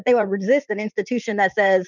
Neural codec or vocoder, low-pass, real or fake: vocoder, 44.1 kHz, 80 mel bands, Vocos; 7.2 kHz; fake